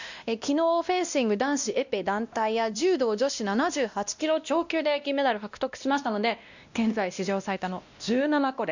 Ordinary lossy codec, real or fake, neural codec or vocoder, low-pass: none; fake; codec, 16 kHz, 1 kbps, X-Codec, WavLM features, trained on Multilingual LibriSpeech; 7.2 kHz